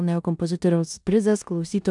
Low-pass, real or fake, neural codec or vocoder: 10.8 kHz; fake; codec, 16 kHz in and 24 kHz out, 0.9 kbps, LongCat-Audio-Codec, four codebook decoder